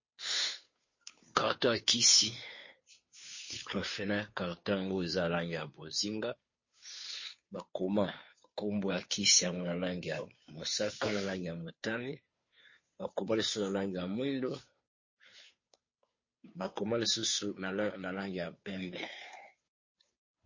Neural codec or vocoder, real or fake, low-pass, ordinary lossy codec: codec, 16 kHz, 2 kbps, FunCodec, trained on Chinese and English, 25 frames a second; fake; 7.2 kHz; MP3, 32 kbps